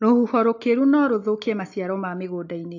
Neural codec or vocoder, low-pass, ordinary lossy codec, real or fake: none; 7.2 kHz; AAC, 48 kbps; real